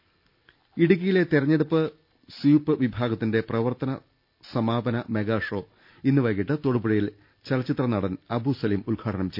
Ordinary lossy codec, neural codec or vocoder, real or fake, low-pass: none; none; real; 5.4 kHz